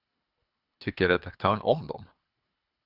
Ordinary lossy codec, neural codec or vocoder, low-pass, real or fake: Opus, 64 kbps; codec, 24 kHz, 3 kbps, HILCodec; 5.4 kHz; fake